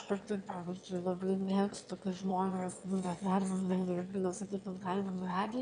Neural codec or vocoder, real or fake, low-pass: autoencoder, 22.05 kHz, a latent of 192 numbers a frame, VITS, trained on one speaker; fake; 9.9 kHz